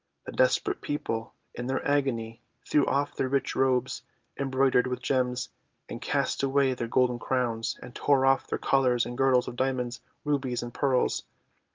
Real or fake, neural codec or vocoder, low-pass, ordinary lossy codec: real; none; 7.2 kHz; Opus, 24 kbps